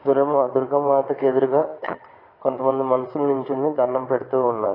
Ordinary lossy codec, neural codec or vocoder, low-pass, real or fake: AAC, 24 kbps; vocoder, 22.05 kHz, 80 mel bands, WaveNeXt; 5.4 kHz; fake